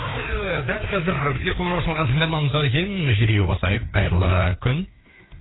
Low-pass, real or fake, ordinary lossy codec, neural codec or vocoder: 7.2 kHz; fake; AAC, 16 kbps; codec, 16 kHz, 2 kbps, FreqCodec, larger model